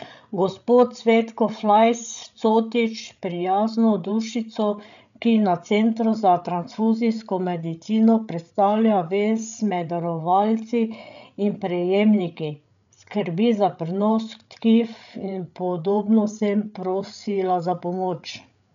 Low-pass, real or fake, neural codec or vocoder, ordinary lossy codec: 7.2 kHz; fake; codec, 16 kHz, 8 kbps, FreqCodec, larger model; none